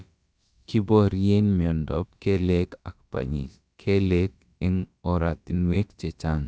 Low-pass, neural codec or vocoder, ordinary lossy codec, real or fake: none; codec, 16 kHz, about 1 kbps, DyCAST, with the encoder's durations; none; fake